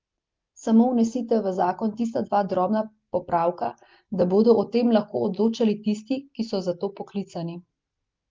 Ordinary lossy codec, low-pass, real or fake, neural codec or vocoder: Opus, 32 kbps; 7.2 kHz; real; none